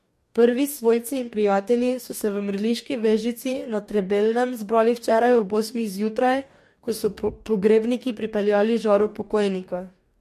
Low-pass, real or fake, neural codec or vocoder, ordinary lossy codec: 14.4 kHz; fake; codec, 44.1 kHz, 2.6 kbps, DAC; MP3, 64 kbps